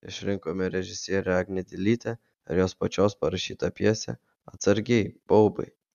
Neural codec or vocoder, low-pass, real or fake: none; 14.4 kHz; real